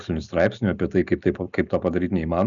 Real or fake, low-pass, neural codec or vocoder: real; 7.2 kHz; none